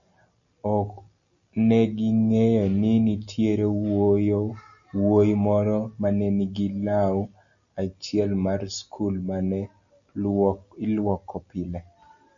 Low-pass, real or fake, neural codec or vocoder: 7.2 kHz; real; none